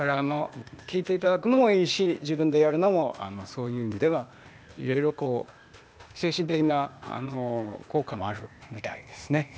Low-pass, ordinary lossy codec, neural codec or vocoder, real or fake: none; none; codec, 16 kHz, 0.8 kbps, ZipCodec; fake